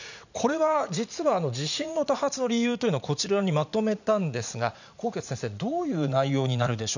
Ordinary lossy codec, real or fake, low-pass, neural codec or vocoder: none; fake; 7.2 kHz; vocoder, 44.1 kHz, 80 mel bands, Vocos